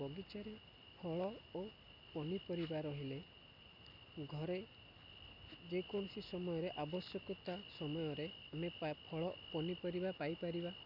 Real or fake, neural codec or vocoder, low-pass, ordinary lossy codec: real; none; 5.4 kHz; none